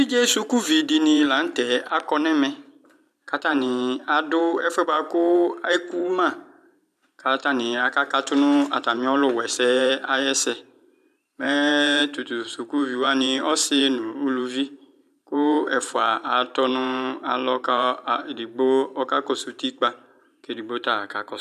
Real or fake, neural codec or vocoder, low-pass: fake; vocoder, 44.1 kHz, 128 mel bands every 512 samples, BigVGAN v2; 14.4 kHz